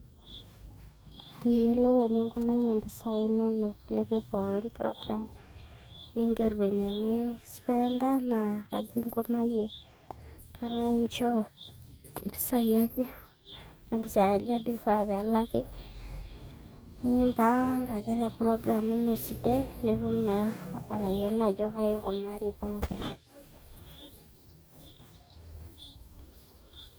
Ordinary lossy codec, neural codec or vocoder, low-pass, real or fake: none; codec, 44.1 kHz, 2.6 kbps, DAC; none; fake